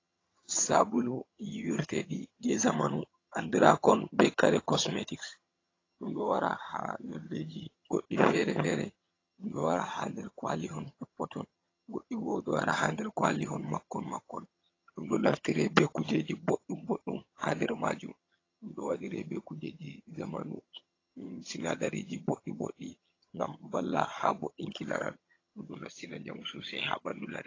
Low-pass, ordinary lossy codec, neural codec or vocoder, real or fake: 7.2 kHz; AAC, 32 kbps; vocoder, 22.05 kHz, 80 mel bands, HiFi-GAN; fake